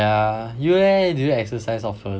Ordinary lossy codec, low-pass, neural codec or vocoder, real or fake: none; none; none; real